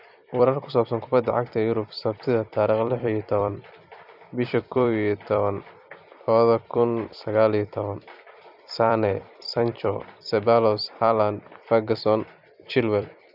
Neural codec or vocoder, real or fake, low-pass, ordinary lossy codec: vocoder, 44.1 kHz, 128 mel bands every 256 samples, BigVGAN v2; fake; 5.4 kHz; none